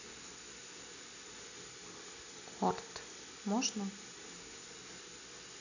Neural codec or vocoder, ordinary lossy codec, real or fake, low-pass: none; none; real; 7.2 kHz